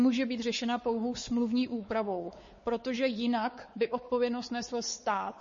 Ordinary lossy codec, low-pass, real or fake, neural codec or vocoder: MP3, 32 kbps; 7.2 kHz; fake; codec, 16 kHz, 4 kbps, X-Codec, WavLM features, trained on Multilingual LibriSpeech